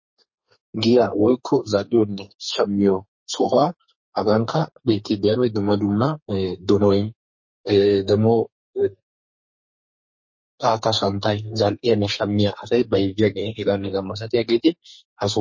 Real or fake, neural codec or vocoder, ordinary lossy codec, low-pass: fake; codec, 32 kHz, 1.9 kbps, SNAC; MP3, 32 kbps; 7.2 kHz